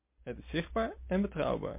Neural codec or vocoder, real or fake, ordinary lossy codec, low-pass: none; real; MP3, 24 kbps; 3.6 kHz